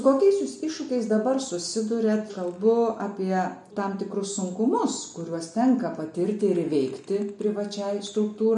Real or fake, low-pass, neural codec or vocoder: real; 10.8 kHz; none